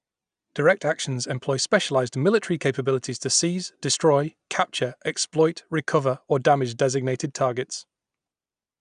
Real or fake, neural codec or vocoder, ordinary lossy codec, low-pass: real; none; none; 9.9 kHz